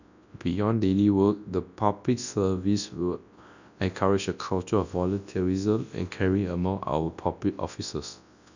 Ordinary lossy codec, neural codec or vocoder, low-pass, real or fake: none; codec, 24 kHz, 0.9 kbps, WavTokenizer, large speech release; 7.2 kHz; fake